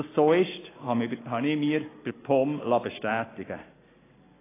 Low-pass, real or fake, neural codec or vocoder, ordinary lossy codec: 3.6 kHz; real; none; AAC, 16 kbps